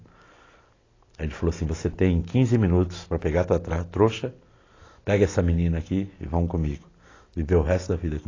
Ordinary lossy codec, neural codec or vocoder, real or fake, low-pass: AAC, 32 kbps; none; real; 7.2 kHz